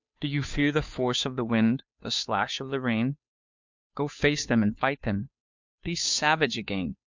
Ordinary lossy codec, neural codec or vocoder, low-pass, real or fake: MP3, 64 kbps; codec, 16 kHz, 2 kbps, FunCodec, trained on Chinese and English, 25 frames a second; 7.2 kHz; fake